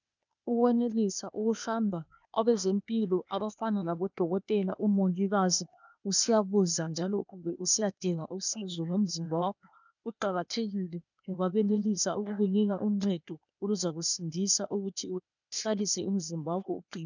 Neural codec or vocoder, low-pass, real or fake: codec, 16 kHz, 0.8 kbps, ZipCodec; 7.2 kHz; fake